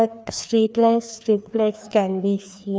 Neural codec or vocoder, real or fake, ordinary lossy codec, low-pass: codec, 16 kHz, 2 kbps, FreqCodec, larger model; fake; none; none